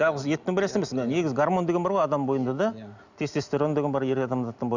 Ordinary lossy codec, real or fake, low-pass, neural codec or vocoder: none; real; 7.2 kHz; none